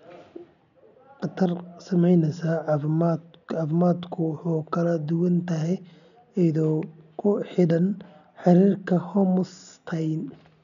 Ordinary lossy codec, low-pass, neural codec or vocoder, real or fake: none; 7.2 kHz; none; real